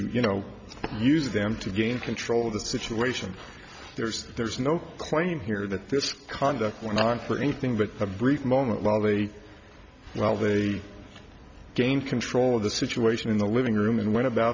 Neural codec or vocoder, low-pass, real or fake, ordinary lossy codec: none; 7.2 kHz; real; MP3, 64 kbps